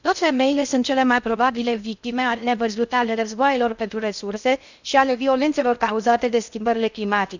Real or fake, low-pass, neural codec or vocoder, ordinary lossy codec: fake; 7.2 kHz; codec, 16 kHz in and 24 kHz out, 0.6 kbps, FocalCodec, streaming, 2048 codes; none